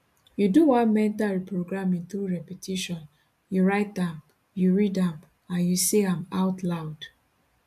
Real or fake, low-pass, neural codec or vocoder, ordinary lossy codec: real; 14.4 kHz; none; none